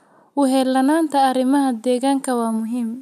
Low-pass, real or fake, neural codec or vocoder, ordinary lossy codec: 14.4 kHz; real; none; none